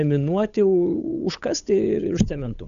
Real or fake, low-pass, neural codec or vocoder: fake; 7.2 kHz; codec, 16 kHz, 8 kbps, FunCodec, trained on Chinese and English, 25 frames a second